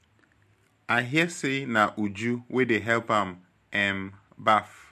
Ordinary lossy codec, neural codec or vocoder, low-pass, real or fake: MP3, 64 kbps; none; 14.4 kHz; real